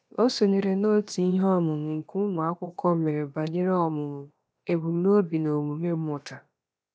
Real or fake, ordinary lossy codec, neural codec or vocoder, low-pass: fake; none; codec, 16 kHz, about 1 kbps, DyCAST, with the encoder's durations; none